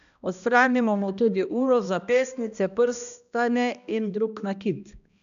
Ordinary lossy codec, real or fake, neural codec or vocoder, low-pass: none; fake; codec, 16 kHz, 1 kbps, X-Codec, HuBERT features, trained on balanced general audio; 7.2 kHz